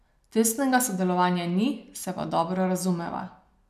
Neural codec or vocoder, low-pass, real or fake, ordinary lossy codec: none; 14.4 kHz; real; none